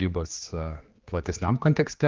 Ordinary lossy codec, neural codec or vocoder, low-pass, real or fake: Opus, 32 kbps; codec, 16 kHz, 2 kbps, X-Codec, HuBERT features, trained on general audio; 7.2 kHz; fake